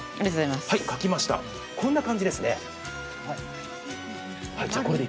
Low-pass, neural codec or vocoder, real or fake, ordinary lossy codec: none; none; real; none